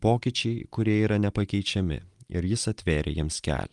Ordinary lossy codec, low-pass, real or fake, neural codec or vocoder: Opus, 32 kbps; 10.8 kHz; real; none